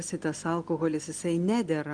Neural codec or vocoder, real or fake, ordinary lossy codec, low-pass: none; real; Opus, 32 kbps; 9.9 kHz